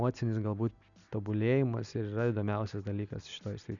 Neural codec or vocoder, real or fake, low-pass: none; real; 7.2 kHz